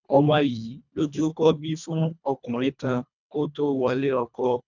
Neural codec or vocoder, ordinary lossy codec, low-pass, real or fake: codec, 24 kHz, 1.5 kbps, HILCodec; none; 7.2 kHz; fake